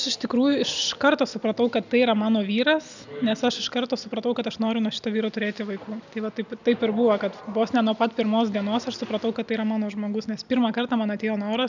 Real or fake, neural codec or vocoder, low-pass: real; none; 7.2 kHz